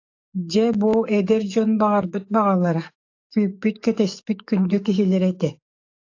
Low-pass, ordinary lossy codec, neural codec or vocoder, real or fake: 7.2 kHz; AAC, 48 kbps; codec, 44.1 kHz, 7.8 kbps, DAC; fake